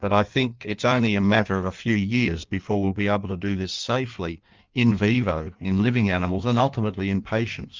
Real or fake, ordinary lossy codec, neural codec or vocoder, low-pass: fake; Opus, 24 kbps; codec, 16 kHz in and 24 kHz out, 1.1 kbps, FireRedTTS-2 codec; 7.2 kHz